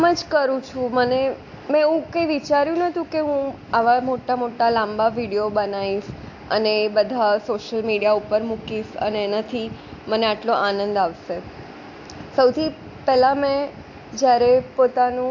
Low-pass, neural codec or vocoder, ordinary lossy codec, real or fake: 7.2 kHz; none; AAC, 48 kbps; real